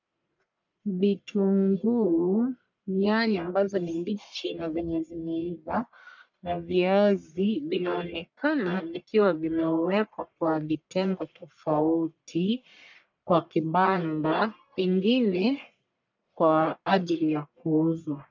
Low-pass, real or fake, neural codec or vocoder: 7.2 kHz; fake; codec, 44.1 kHz, 1.7 kbps, Pupu-Codec